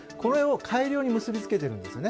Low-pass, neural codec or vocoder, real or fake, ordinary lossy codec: none; none; real; none